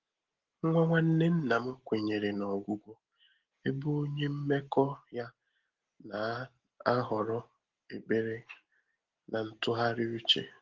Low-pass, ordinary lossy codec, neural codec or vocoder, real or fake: 7.2 kHz; Opus, 16 kbps; none; real